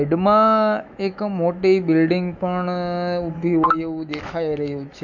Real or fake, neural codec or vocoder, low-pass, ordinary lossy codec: fake; autoencoder, 48 kHz, 128 numbers a frame, DAC-VAE, trained on Japanese speech; 7.2 kHz; none